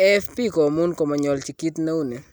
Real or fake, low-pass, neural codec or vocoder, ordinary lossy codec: real; none; none; none